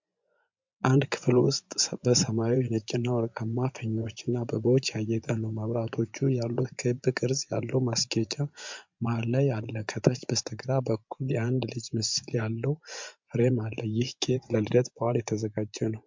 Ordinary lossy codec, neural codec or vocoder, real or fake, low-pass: MP3, 64 kbps; vocoder, 24 kHz, 100 mel bands, Vocos; fake; 7.2 kHz